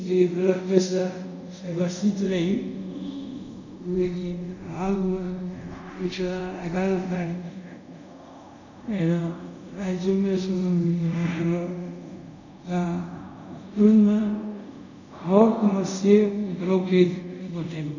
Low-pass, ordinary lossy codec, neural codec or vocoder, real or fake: 7.2 kHz; none; codec, 24 kHz, 0.5 kbps, DualCodec; fake